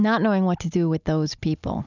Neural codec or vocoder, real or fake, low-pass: none; real; 7.2 kHz